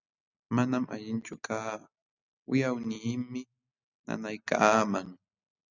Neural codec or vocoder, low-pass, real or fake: none; 7.2 kHz; real